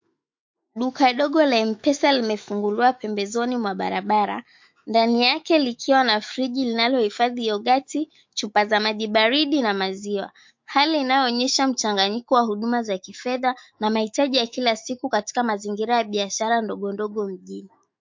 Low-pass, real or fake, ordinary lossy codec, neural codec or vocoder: 7.2 kHz; fake; MP3, 48 kbps; autoencoder, 48 kHz, 128 numbers a frame, DAC-VAE, trained on Japanese speech